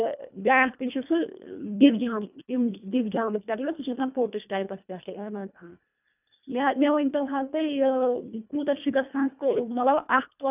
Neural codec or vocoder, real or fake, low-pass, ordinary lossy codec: codec, 24 kHz, 1.5 kbps, HILCodec; fake; 3.6 kHz; none